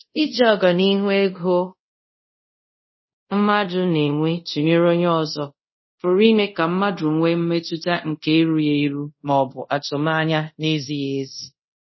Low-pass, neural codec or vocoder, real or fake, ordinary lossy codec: 7.2 kHz; codec, 24 kHz, 0.5 kbps, DualCodec; fake; MP3, 24 kbps